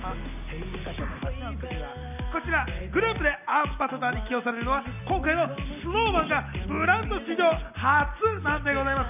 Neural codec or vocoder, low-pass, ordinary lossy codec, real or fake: none; 3.6 kHz; none; real